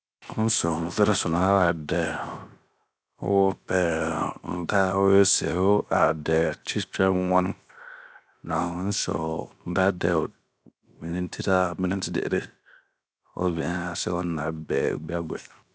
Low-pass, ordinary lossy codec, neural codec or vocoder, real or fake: none; none; codec, 16 kHz, 0.7 kbps, FocalCodec; fake